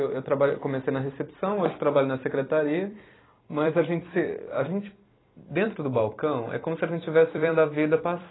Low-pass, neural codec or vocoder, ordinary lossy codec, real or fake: 7.2 kHz; vocoder, 44.1 kHz, 128 mel bands every 256 samples, BigVGAN v2; AAC, 16 kbps; fake